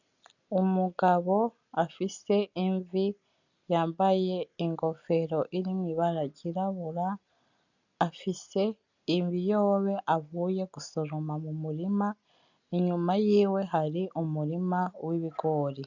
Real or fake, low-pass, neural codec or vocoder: real; 7.2 kHz; none